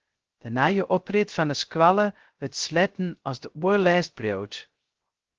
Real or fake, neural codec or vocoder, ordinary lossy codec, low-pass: fake; codec, 16 kHz, 0.3 kbps, FocalCodec; Opus, 16 kbps; 7.2 kHz